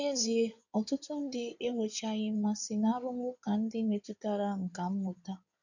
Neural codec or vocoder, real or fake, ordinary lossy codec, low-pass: codec, 16 kHz in and 24 kHz out, 2.2 kbps, FireRedTTS-2 codec; fake; none; 7.2 kHz